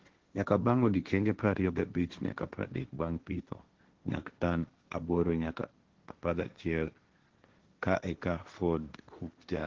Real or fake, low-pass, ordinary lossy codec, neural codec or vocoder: fake; 7.2 kHz; Opus, 16 kbps; codec, 16 kHz, 1.1 kbps, Voila-Tokenizer